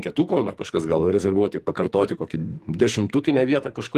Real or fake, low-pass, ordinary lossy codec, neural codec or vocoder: fake; 14.4 kHz; Opus, 24 kbps; codec, 44.1 kHz, 2.6 kbps, SNAC